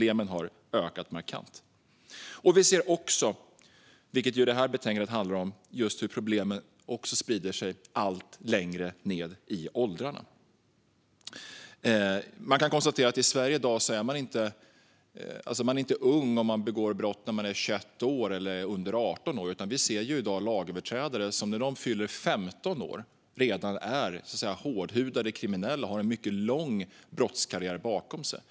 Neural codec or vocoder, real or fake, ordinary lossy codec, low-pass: none; real; none; none